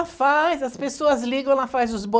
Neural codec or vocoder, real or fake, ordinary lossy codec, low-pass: none; real; none; none